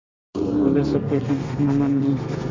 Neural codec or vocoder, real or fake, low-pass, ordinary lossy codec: codec, 32 kHz, 1.9 kbps, SNAC; fake; 7.2 kHz; MP3, 48 kbps